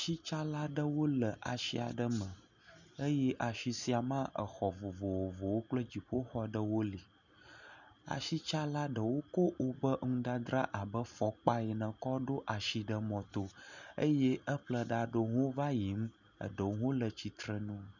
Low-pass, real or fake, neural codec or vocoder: 7.2 kHz; real; none